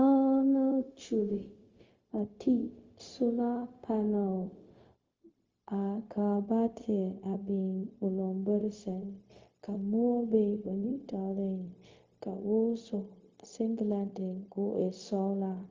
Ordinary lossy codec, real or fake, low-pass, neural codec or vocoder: Opus, 32 kbps; fake; 7.2 kHz; codec, 16 kHz, 0.4 kbps, LongCat-Audio-Codec